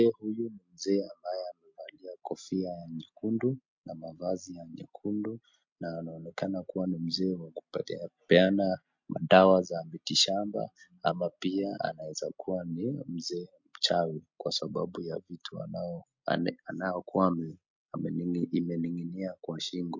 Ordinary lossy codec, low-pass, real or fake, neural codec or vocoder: MP3, 48 kbps; 7.2 kHz; real; none